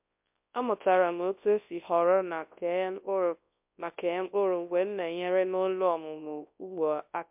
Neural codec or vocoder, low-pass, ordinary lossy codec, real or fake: codec, 24 kHz, 0.9 kbps, WavTokenizer, large speech release; 3.6 kHz; MP3, 32 kbps; fake